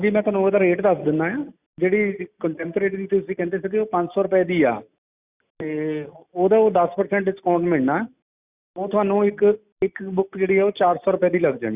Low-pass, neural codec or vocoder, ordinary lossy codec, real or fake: 3.6 kHz; none; Opus, 64 kbps; real